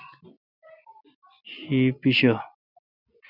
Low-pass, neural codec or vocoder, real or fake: 5.4 kHz; none; real